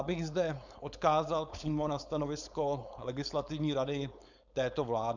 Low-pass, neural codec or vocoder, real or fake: 7.2 kHz; codec, 16 kHz, 4.8 kbps, FACodec; fake